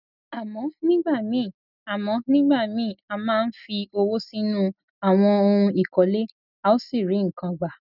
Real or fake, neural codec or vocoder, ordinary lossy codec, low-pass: real; none; none; 5.4 kHz